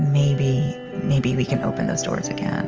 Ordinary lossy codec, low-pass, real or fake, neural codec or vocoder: Opus, 24 kbps; 7.2 kHz; real; none